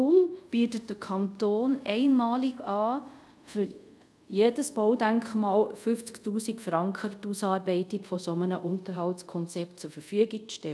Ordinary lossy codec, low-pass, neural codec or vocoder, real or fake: none; none; codec, 24 kHz, 0.5 kbps, DualCodec; fake